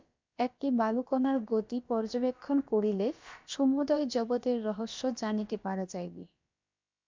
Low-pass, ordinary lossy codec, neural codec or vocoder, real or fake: 7.2 kHz; MP3, 64 kbps; codec, 16 kHz, about 1 kbps, DyCAST, with the encoder's durations; fake